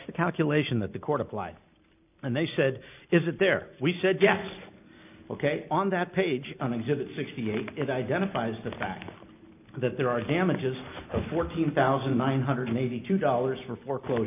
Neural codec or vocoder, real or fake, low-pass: none; real; 3.6 kHz